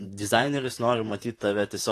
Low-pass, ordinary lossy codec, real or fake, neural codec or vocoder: 14.4 kHz; AAC, 48 kbps; fake; vocoder, 44.1 kHz, 128 mel bands, Pupu-Vocoder